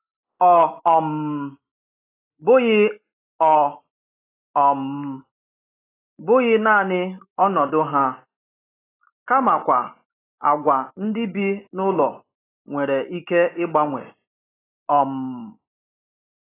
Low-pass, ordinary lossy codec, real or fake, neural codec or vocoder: 3.6 kHz; AAC, 16 kbps; real; none